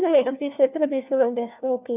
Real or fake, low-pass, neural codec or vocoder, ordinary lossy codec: fake; 3.6 kHz; codec, 16 kHz, 1 kbps, FunCodec, trained on LibriTTS, 50 frames a second; none